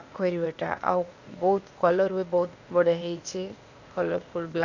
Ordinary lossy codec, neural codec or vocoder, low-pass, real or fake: none; codec, 16 kHz, 0.8 kbps, ZipCodec; 7.2 kHz; fake